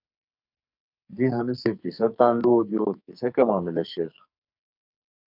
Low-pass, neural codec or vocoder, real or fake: 5.4 kHz; codec, 44.1 kHz, 2.6 kbps, SNAC; fake